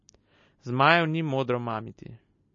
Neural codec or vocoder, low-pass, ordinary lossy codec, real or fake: none; 7.2 kHz; MP3, 32 kbps; real